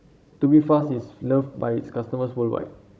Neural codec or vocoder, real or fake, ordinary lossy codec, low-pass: codec, 16 kHz, 16 kbps, FunCodec, trained on Chinese and English, 50 frames a second; fake; none; none